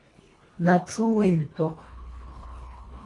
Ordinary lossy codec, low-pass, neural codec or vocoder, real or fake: AAC, 32 kbps; 10.8 kHz; codec, 24 kHz, 1.5 kbps, HILCodec; fake